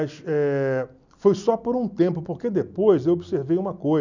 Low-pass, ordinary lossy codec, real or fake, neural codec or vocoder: 7.2 kHz; none; real; none